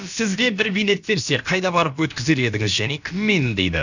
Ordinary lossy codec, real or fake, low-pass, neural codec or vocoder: none; fake; 7.2 kHz; codec, 16 kHz, about 1 kbps, DyCAST, with the encoder's durations